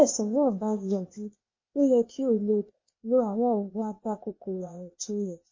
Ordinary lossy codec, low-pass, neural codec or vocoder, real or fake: MP3, 32 kbps; 7.2 kHz; codec, 16 kHz, 0.8 kbps, ZipCodec; fake